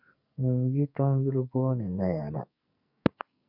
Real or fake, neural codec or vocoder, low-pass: fake; codec, 44.1 kHz, 2.6 kbps, SNAC; 5.4 kHz